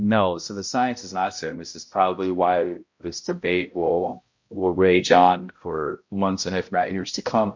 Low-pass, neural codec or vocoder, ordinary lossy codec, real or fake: 7.2 kHz; codec, 16 kHz, 0.5 kbps, X-Codec, HuBERT features, trained on balanced general audio; MP3, 48 kbps; fake